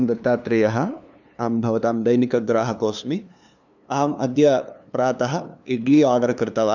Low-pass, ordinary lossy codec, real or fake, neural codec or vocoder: 7.2 kHz; none; fake; codec, 16 kHz, 2 kbps, FunCodec, trained on LibriTTS, 25 frames a second